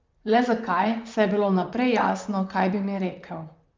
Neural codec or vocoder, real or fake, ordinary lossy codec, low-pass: vocoder, 44.1 kHz, 128 mel bands, Pupu-Vocoder; fake; Opus, 24 kbps; 7.2 kHz